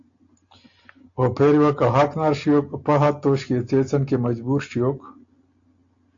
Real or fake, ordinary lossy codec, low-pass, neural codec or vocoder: real; AAC, 64 kbps; 7.2 kHz; none